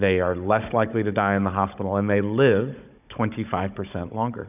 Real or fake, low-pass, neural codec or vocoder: fake; 3.6 kHz; codec, 16 kHz, 16 kbps, FunCodec, trained on Chinese and English, 50 frames a second